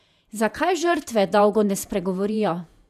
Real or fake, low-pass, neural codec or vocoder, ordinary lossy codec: fake; 14.4 kHz; vocoder, 48 kHz, 128 mel bands, Vocos; none